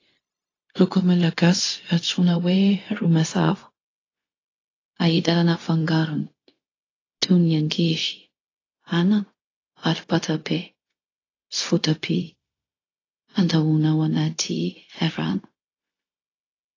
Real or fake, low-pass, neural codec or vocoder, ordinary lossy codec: fake; 7.2 kHz; codec, 16 kHz, 0.4 kbps, LongCat-Audio-Codec; AAC, 32 kbps